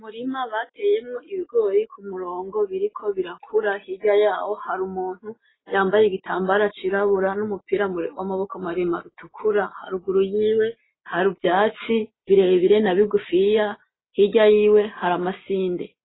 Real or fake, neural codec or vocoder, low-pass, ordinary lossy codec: real; none; 7.2 kHz; AAC, 16 kbps